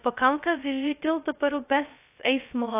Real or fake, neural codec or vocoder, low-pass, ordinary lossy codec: fake; codec, 16 kHz, 0.2 kbps, FocalCodec; 3.6 kHz; AAC, 24 kbps